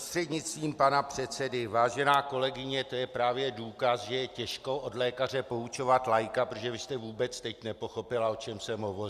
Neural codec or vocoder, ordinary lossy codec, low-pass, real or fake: none; Opus, 64 kbps; 14.4 kHz; real